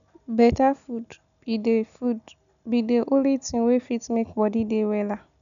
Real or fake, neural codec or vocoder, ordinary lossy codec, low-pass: real; none; none; 7.2 kHz